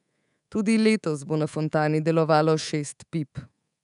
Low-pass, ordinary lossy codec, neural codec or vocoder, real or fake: 10.8 kHz; none; codec, 24 kHz, 3.1 kbps, DualCodec; fake